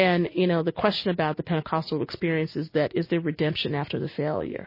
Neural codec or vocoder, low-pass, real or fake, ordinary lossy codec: none; 5.4 kHz; real; MP3, 24 kbps